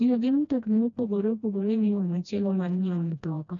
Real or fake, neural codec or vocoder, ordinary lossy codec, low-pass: fake; codec, 16 kHz, 1 kbps, FreqCodec, smaller model; none; 7.2 kHz